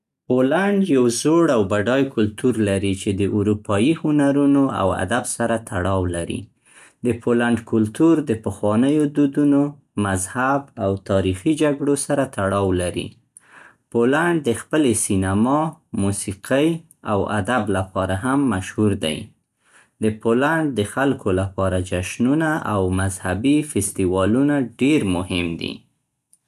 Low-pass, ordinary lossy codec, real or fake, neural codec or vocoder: 14.4 kHz; none; real; none